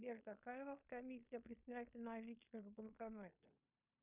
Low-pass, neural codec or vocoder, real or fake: 3.6 kHz; codec, 16 kHz in and 24 kHz out, 0.9 kbps, LongCat-Audio-Codec, fine tuned four codebook decoder; fake